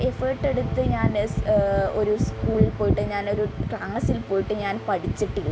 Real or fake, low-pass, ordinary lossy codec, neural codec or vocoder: real; none; none; none